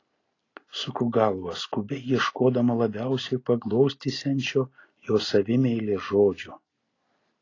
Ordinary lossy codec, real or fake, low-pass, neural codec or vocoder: AAC, 32 kbps; real; 7.2 kHz; none